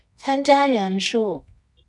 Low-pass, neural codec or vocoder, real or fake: 10.8 kHz; codec, 24 kHz, 0.9 kbps, WavTokenizer, medium music audio release; fake